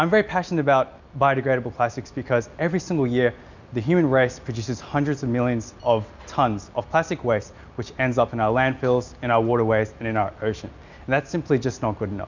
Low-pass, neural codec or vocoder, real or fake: 7.2 kHz; none; real